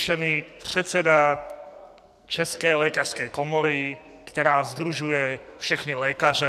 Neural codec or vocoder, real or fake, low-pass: codec, 44.1 kHz, 2.6 kbps, SNAC; fake; 14.4 kHz